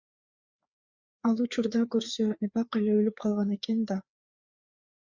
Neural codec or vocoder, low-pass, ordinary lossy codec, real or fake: codec, 16 kHz, 4 kbps, FreqCodec, larger model; 7.2 kHz; Opus, 64 kbps; fake